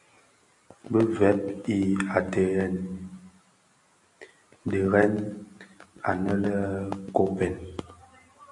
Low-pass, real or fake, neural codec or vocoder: 10.8 kHz; real; none